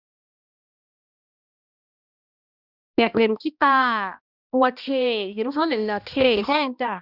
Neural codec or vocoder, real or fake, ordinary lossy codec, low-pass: codec, 16 kHz, 1 kbps, X-Codec, HuBERT features, trained on balanced general audio; fake; none; 5.4 kHz